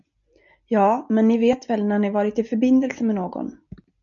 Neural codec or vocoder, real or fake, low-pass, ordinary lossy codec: none; real; 7.2 kHz; MP3, 96 kbps